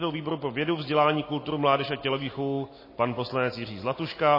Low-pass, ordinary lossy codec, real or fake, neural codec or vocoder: 5.4 kHz; MP3, 24 kbps; real; none